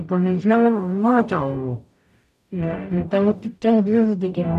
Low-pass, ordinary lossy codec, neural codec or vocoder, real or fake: 14.4 kHz; none; codec, 44.1 kHz, 0.9 kbps, DAC; fake